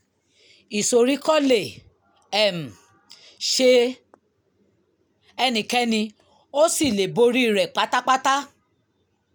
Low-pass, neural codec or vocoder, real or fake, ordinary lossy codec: none; none; real; none